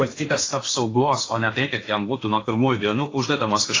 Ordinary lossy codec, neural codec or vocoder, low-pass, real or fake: AAC, 32 kbps; codec, 16 kHz in and 24 kHz out, 0.8 kbps, FocalCodec, streaming, 65536 codes; 7.2 kHz; fake